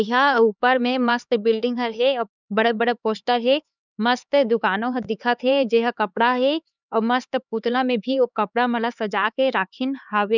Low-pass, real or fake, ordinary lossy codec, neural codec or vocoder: 7.2 kHz; fake; none; codec, 16 kHz, 4 kbps, X-Codec, HuBERT features, trained on LibriSpeech